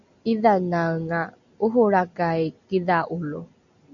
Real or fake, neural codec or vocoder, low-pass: real; none; 7.2 kHz